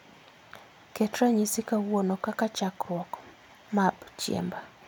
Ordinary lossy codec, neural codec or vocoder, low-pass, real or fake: none; none; none; real